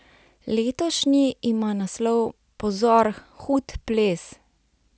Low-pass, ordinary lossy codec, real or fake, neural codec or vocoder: none; none; real; none